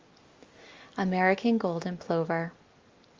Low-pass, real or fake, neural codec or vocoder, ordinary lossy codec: 7.2 kHz; real; none; Opus, 32 kbps